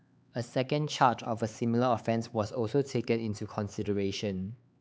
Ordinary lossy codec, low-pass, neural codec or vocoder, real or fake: none; none; codec, 16 kHz, 4 kbps, X-Codec, HuBERT features, trained on LibriSpeech; fake